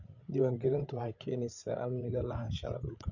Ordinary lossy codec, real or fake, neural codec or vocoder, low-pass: none; fake; codec, 16 kHz, 8 kbps, FreqCodec, larger model; 7.2 kHz